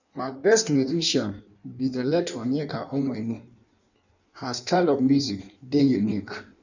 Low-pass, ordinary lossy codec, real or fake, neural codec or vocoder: 7.2 kHz; none; fake; codec, 16 kHz in and 24 kHz out, 1.1 kbps, FireRedTTS-2 codec